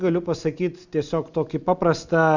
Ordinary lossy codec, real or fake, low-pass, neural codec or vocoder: Opus, 64 kbps; real; 7.2 kHz; none